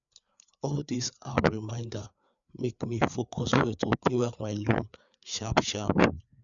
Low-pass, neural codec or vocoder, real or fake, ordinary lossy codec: 7.2 kHz; codec, 16 kHz, 4 kbps, FreqCodec, larger model; fake; none